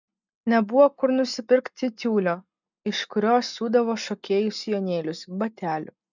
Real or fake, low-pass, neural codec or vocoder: real; 7.2 kHz; none